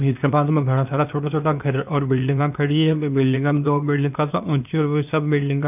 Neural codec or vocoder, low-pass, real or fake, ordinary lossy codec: codec, 16 kHz, 0.8 kbps, ZipCodec; 3.6 kHz; fake; none